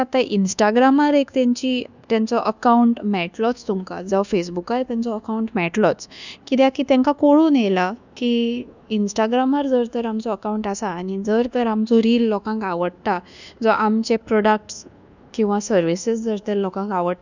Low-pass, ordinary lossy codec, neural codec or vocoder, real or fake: 7.2 kHz; none; codec, 24 kHz, 1.2 kbps, DualCodec; fake